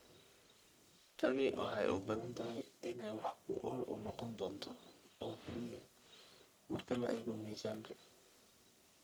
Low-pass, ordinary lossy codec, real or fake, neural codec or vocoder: none; none; fake; codec, 44.1 kHz, 1.7 kbps, Pupu-Codec